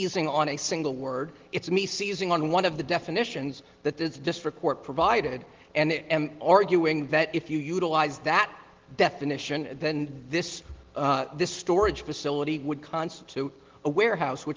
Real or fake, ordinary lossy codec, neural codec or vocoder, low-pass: real; Opus, 16 kbps; none; 7.2 kHz